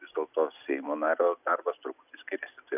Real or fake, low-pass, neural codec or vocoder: real; 3.6 kHz; none